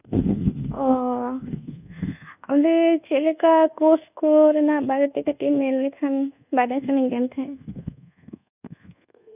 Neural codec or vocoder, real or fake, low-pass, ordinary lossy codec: codec, 24 kHz, 1.2 kbps, DualCodec; fake; 3.6 kHz; none